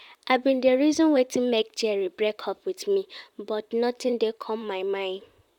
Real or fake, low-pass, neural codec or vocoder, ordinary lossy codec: fake; 19.8 kHz; vocoder, 44.1 kHz, 128 mel bands, Pupu-Vocoder; none